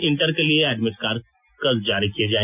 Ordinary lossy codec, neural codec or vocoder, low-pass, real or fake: none; none; 3.6 kHz; real